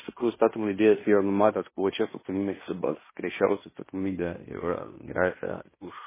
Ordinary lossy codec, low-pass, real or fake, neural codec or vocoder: MP3, 16 kbps; 3.6 kHz; fake; codec, 16 kHz in and 24 kHz out, 0.9 kbps, LongCat-Audio-Codec, fine tuned four codebook decoder